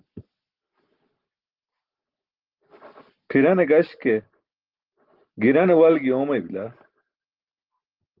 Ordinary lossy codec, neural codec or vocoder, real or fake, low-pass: Opus, 16 kbps; none; real; 5.4 kHz